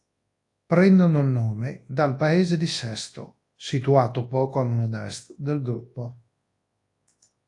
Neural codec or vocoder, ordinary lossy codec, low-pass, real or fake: codec, 24 kHz, 0.9 kbps, WavTokenizer, large speech release; AAC, 48 kbps; 10.8 kHz; fake